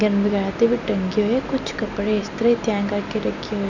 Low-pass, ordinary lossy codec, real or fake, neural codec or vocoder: 7.2 kHz; none; real; none